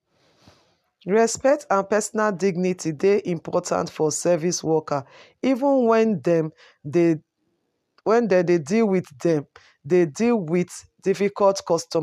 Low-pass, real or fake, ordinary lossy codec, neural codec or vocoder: 14.4 kHz; real; none; none